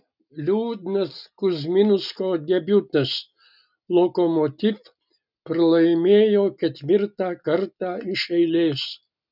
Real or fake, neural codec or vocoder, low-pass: real; none; 5.4 kHz